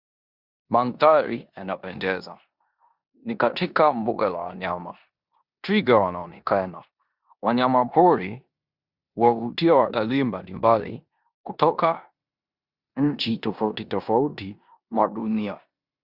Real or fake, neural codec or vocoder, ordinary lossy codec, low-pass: fake; codec, 16 kHz in and 24 kHz out, 0.9 kbps, LongCat-Audio-Codec, four codebook decoder; Opus, 64 kbps; 5.4 kHz